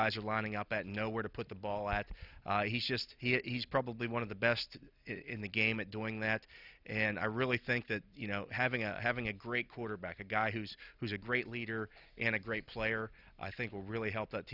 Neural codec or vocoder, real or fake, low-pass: none; real; 5.4 kHz